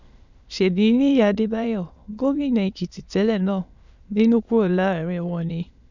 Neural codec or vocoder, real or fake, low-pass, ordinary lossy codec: autoencoder, 22.05 kHz, a latent of 192 numbers a frame, VITS, trained on many speakers; fake; 7.2 kHz; none